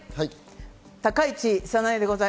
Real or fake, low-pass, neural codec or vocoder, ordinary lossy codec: real; none; none; none